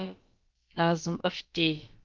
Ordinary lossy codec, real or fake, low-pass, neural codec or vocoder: Opus, 32 kbps; fake; 7.2 kHz; codec, 16 kHz, about 1 kbps, DyCAST, with the encoder's durations